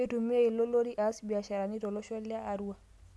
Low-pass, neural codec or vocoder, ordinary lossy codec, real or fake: none; none; none; real